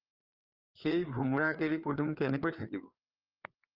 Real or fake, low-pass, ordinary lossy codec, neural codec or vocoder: fake; 5.4 kHz; Opus, 24 kbps; codec, 16 kHz, 4 kbps, FreqCodec, larger model